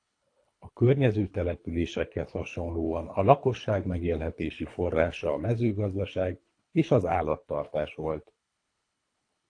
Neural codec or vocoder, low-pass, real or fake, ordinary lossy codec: codec, 24 kHz, 3 kbps, HILCodec; 9.9 kHz; fake; AAC, 48 kbps